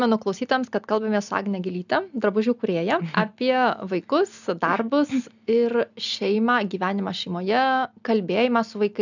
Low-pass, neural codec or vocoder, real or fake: 7.2 kHz; none; real